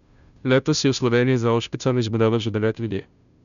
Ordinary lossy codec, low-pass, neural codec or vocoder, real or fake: MP3, 96 kbps; 7.2 kHz; codec, 16 kHz, 0.5 kbps, FunCodec, trained on Chinese and English, 25 frames a second; fake